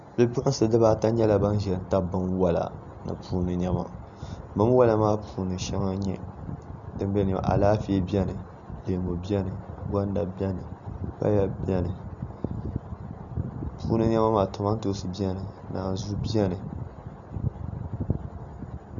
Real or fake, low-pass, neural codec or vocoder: real; 7.2 kHz; none